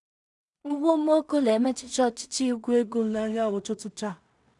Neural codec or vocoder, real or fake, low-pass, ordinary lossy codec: codec, 16 kHz in and 24 kHz out, 0.4 kbps, LongCat-Audio-Codec, two codebook decoder; fake; 10.8 kHz; none